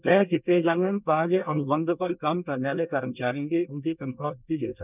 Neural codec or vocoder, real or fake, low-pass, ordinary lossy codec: codec, 24 kHz, 1 kbps, SNAC; fake; 3.6 kHz; none